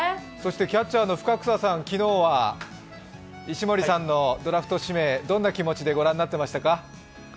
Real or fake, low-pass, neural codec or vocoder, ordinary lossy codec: real; none; none; none